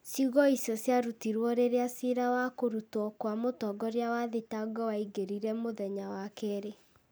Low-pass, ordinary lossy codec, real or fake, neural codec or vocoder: none; none; real; none